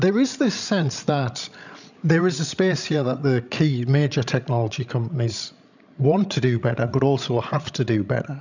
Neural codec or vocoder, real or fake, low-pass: codec, 16 kHz, 16 kbps, FreqCodec, larger model; fake; 7.2 kHz